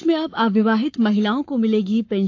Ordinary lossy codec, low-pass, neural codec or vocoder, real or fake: AAC, 48 kbps; 7.2 kHz; codec, 44.1 kHz, 7.8 kbps, Pupu-Codec; fake